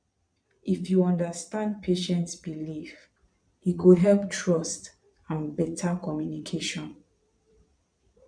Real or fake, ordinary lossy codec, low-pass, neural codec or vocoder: fake; none; 9.9 kHz; vocoder, 22.05 kHz, 80 mel bands, Vocos